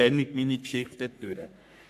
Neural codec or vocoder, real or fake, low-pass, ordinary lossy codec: codec, 44.1 kHz, 3.4 kbps, Pupu-Codec; fake; 14.4 kHz; none